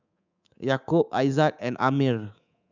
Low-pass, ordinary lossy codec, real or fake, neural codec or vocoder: 7.2 kHz; none; fake; codec, 24 kHz, 3.1 kbps, DualCodec